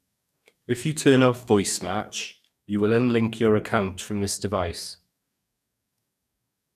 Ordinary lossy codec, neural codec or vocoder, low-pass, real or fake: none; codec, 44.1 kHz, 2.6 kbps, DAC; 14.4 kHz; fake